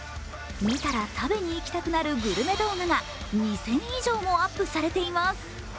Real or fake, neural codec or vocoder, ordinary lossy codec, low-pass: real; none; none; none